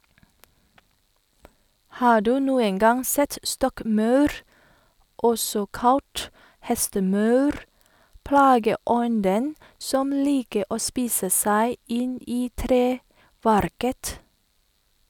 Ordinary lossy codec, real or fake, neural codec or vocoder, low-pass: none; real; none; 19.8 kHz